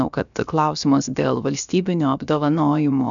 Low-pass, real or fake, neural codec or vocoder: 7.2 kHz; fake; codec, 16 kHz, about 1 kbps, DyCAST, with the encoder's durations